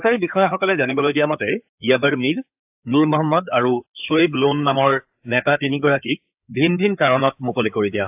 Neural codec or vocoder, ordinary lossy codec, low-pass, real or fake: codec, 16 kHz in and 24 kHz out, 2.2 kbps, FireRedTTS-2 codec; AAC, 32 kbps; 3.6 kHz; fake